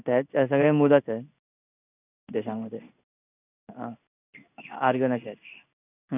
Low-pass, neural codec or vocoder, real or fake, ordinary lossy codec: 3.6 kHz; codec, 16 kHz in and 24 kHz out, 1 kbps, XY-Tokenizer; fake; none